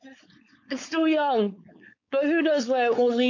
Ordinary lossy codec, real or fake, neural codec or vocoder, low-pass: AAC, 48 kbps; fake; codec, 16 kHz, 4.8 kbps, FACodec; 7.2 kHz